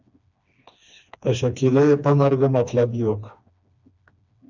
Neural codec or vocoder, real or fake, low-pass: codec, 16 kHz, 2 kbps, FreqCodec, smaller model; fake; 7.2 kHz